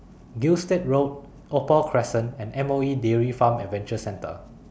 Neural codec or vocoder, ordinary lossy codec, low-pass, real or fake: none; none; none; real